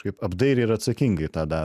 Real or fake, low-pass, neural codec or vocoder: real; 14.4 kHz; none